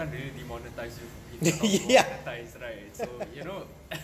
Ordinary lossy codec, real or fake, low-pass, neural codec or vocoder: AAC, 96 kbps; real; 14.4 kHz; none